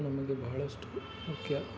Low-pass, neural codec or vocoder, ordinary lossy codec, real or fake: none; none; none; real